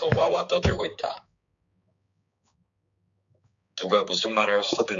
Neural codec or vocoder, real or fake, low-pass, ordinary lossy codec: codec, 16 kHz, 4 kbps, X-Codec, HuBERT features, trained on balanced general audio; fake; 7.2 kHz; MP3, 64 kbps